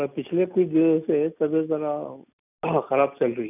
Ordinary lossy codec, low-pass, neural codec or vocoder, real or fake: none; 3.6 kHz; none; real